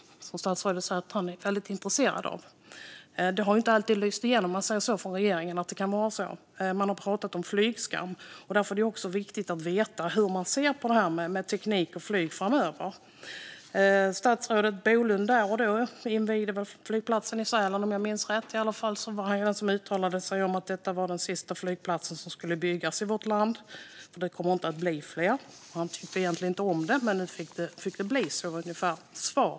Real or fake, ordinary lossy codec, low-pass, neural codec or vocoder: real; none; none; none